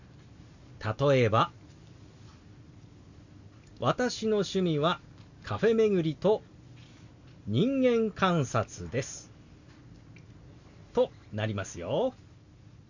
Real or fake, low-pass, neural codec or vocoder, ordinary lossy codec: real; 7.2 kHz; none; none